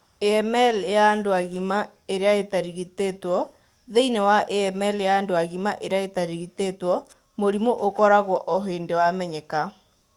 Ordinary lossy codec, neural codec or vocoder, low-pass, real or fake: Opus, 64 kbps; codec, 44.1 kHz, 7.8 kbps, DAC; 19.8 kHz; fake